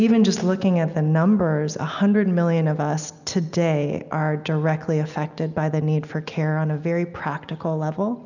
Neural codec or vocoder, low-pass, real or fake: none; 7.2 kHz; real